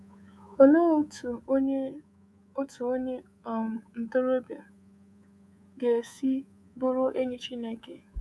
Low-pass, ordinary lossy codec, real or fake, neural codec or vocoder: none; none; fake; codec, 24 kHz, 3.1 kbps, DualCodec